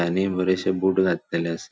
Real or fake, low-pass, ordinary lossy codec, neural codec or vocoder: real; none; none; none